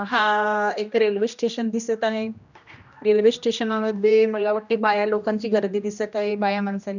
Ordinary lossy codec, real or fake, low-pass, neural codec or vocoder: none; fake; 7.2 kHz; codec, 16 kHz, 1 kbps, X-Codec, HuBERT features, trained on general audio